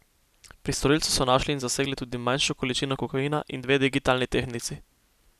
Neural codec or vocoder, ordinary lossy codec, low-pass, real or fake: none; none; 14.4 kHz; real